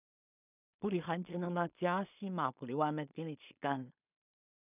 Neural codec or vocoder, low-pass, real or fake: codec, 16 kHz in and 24 kHz out, 0.4 kbps, LongCat-Audio-Codec, two codebook decoder; 3.6 kHz; fake